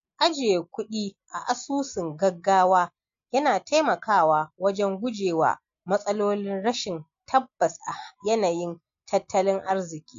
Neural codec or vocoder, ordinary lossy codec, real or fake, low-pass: none; MP3, 48 kbps; real; 7.2 kHz